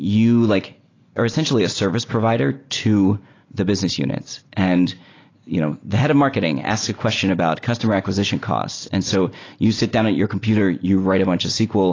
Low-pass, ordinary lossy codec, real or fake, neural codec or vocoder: 7.2 kHz; AAC, 32 kbps; real; none